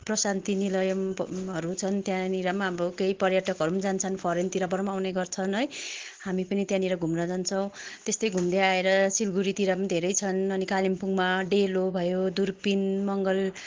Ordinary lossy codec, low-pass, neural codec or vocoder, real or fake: Opus, 16 kbps; 7.2 kHz; none; real